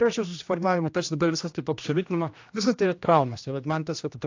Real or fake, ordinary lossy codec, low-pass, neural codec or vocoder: fake; AAC, 48 kbps; 7.2 kHz; codec, 16 kHz, 1 kbps, X-Codec, HuBERT features, trained on general audio